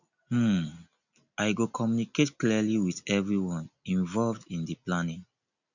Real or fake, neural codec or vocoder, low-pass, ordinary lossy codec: real; none; 7.2 kHz; none